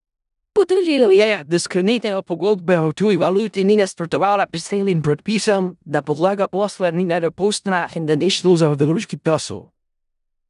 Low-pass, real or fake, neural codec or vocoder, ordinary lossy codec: 10.8 kHz; fake; codec, 16 kHz in and 24 kHz out, 0.4 kbps, LongCat-Audio-Codec, four codebook decoder; none